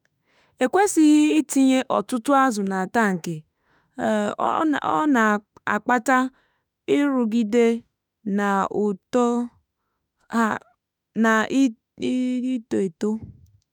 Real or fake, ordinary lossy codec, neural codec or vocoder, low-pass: fake; none; autoencoder, 48 kHz, 32 numbers a frame, DAC-VAE, trained on Japanese speech; 19.8 kHz